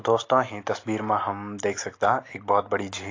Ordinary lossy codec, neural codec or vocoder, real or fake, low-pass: AAC, 32 kbps; none; real; 7.2 kHz